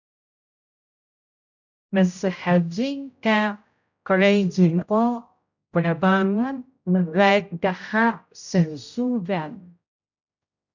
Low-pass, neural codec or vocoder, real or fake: 7.2 kHz; codec, 16 kHz, 0.5 kbps, X-Codec, HuBERT features, trained on general audio; fake